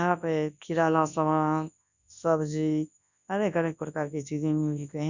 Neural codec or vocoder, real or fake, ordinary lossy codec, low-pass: codec, 24 kHz, 0.9 kbps, WavTokenizer, large speech release; fake; none; 7.2 kHz